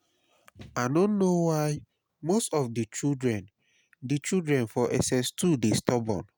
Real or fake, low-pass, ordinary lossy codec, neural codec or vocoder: real; none; none; none